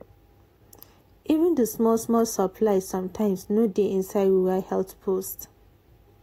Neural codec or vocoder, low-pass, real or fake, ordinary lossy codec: none; 19.8 kHz; real; AAC, 48 kbps